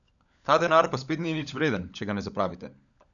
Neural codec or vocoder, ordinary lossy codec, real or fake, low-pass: codec, 16 kHz, 16 kbps, FunCodec, trained on LibriTTS, 50 frames a second; none; fake; 7.2 kHz